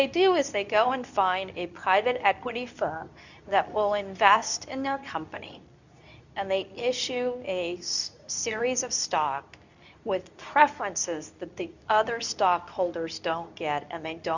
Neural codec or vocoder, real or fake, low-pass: codec, 24 kHz, 0.9 kbps, WavTokenizer, medium speech release version 2; fake; 7.2 kHz